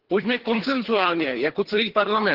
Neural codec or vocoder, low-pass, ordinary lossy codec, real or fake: codec, 24 kHz, 3 kbps, HILCodec; 5.4 kHz; Opus, 16 kbps; fake